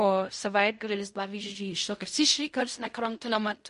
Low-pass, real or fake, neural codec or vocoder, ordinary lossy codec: 10.8 kHz; fake; codec, 16 kHz in and 24 kHz out, 0.4 kbps, LongCat-Audio-Codec, fine tuned four codebook decoder; MP3, 48 kbps